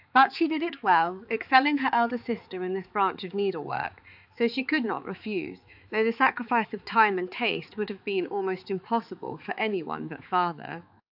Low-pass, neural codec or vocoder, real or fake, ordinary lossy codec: 5.4 kHz; codec, 16 kHz, 4 kbps, X-Codec, HuBERT features, trained on balanced general audio; fake; AAC, 48 kbps